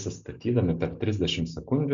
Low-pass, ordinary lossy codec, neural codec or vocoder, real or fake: 7.2 kHz; MP3, 64 kbps; none; real